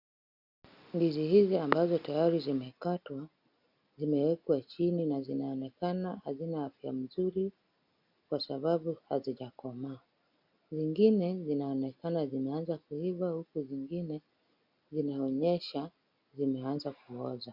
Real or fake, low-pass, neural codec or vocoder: real; 5.4 kHz; none